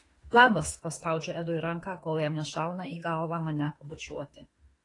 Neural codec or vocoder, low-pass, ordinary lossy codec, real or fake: autoencoder, 48 kHz, 32 numbers a frame, DAC-VAE, trained on Japanese speech; 10.8 kHz; AAC, 32 kbps; fake